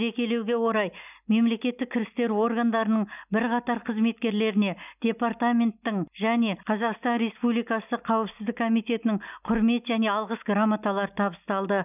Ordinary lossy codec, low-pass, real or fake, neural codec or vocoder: none; 3.6 kHz; real; none